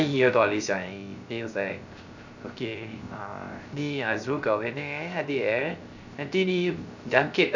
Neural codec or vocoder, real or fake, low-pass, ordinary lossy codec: codec, 16 kHz, 0.3 kbps, FocalCodec; fake; 7.2 kHz; none